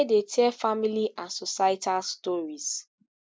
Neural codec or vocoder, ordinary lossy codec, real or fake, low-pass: none; none; real; none